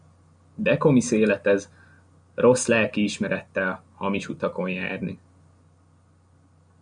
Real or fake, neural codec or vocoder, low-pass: real; none; 9.9 kHz